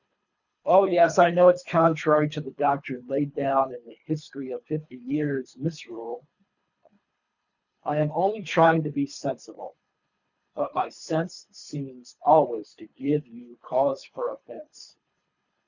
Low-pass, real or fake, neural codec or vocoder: 7.2 kHz; fake; codec, 24 kHz, 3 kbps, HILCodec